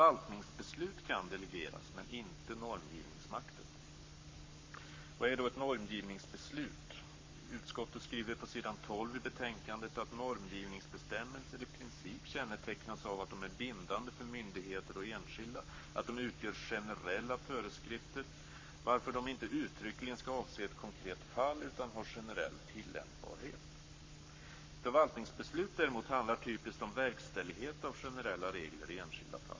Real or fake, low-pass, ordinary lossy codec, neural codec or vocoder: fake; 7.2 kHz; MP3, 32 kbps; codec, 44.1 kHz, 7.8 kbps, Pupu-Codec